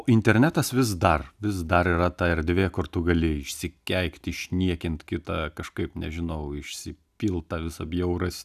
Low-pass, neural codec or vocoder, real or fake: 14.4 kHz; none; real